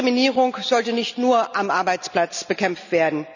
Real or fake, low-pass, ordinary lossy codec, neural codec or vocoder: real; 7.2 kHz; none; none